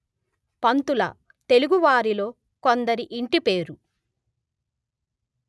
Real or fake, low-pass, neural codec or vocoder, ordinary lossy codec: real; none; none; none